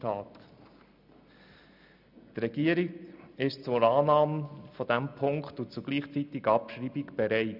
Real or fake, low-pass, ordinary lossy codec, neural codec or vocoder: real; 5.4 kHz; none; none